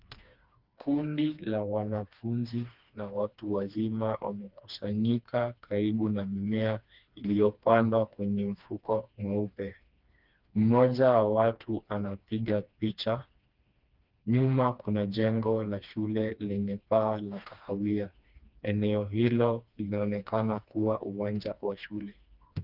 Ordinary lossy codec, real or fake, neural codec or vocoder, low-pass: Opus, 24 kbps; fake; codec, 16 kHz, 2 kbps, FreqCodec, smaller model; 5.4 kHz